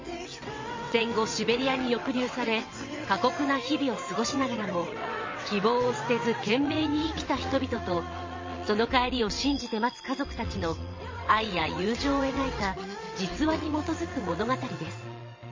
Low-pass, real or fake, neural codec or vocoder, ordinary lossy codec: 7.2 kHz; fake; vocoder, 22.05 kHz, 80 mel bands, WaveNeXt; MP3, 32 kbps